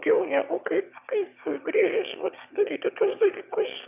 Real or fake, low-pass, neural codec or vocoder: fake; 3.6 kHz; autoencoder, 22.05 kHz, a latent of 192 numbers a frame, VITS, trained on one speaker